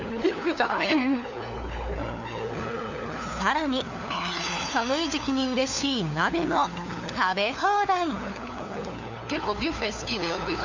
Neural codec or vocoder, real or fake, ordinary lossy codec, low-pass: codec, 16 kHz, 4 kbps, FunCodec, trained on LibriTTS, 50 frames a second; fake; none; 7.2 kHz